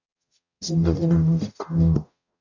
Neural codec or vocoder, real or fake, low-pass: codec, 44.1 kHz, 0.9 kbps, DAC; fake; 7.2 kHz